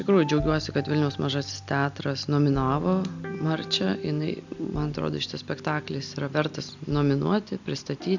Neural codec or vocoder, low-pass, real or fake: none; 7.2 kHz; real